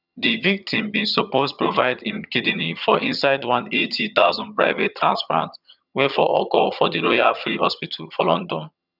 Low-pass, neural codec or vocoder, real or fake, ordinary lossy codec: 5.4 kHz; vocoder, 22.05 kHz, 80 mel bands, HiFi-GAN; fake; none